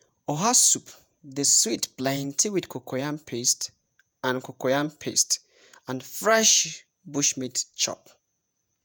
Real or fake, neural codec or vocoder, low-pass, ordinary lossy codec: fake; vocoder, 48 kHz, 128 mel bands, Vocos; none; none